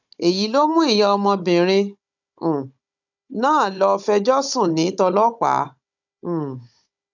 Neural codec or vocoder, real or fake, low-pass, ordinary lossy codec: codec, 16 kHz, 16 kbps, FunCodec, trained on Chinese and English, 50 frames a second; fake; 7.2 kHz; none